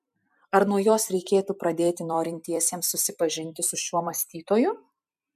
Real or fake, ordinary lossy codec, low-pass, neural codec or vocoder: fake; MP3, 96 kbps; 14.4 kHz; vocoder, 44.1 kHz, 128 mel bands every 256 samples, BigVGAN v2